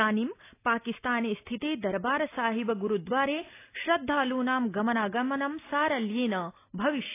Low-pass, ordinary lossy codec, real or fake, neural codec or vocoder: 3.6 kHz; AAC, 24 kbps; real; none